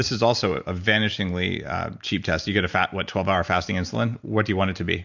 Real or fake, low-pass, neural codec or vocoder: real; 7.2 kHz; none